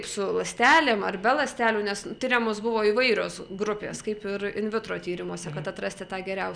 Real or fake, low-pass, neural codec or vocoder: real; 9.9 kHz; none